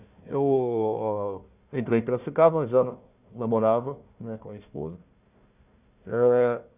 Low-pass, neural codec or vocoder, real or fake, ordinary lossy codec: 3.6 kHz; codec, 16 kHz, 1 kbps, FunCodec, trained on Chinese and English, 50 frames a second; fake; none